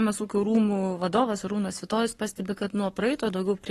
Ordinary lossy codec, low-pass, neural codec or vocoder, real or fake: AAC, 32 kbps; 19.8 kHz; codec, 44.1 kHz, 7.8 kbps, Pupu-Codec; fake